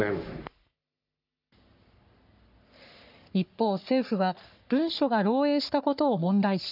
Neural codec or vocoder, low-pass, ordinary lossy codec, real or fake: codec, 44.1 kHz, 3.4 kbps, Pupu-Codec; 5.4 kHz; none; fake